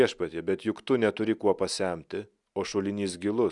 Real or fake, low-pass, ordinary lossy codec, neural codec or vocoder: real; 10.8 kHz; Opus, 64 kbps; none